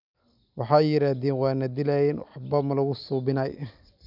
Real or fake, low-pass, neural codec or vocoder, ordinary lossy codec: real; 5.4 kHz; none; none